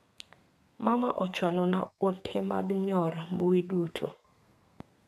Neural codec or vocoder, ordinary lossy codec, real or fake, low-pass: codec, 32 kHz, 1.9 kbps, SNAC; none; fake; 14.4 kHz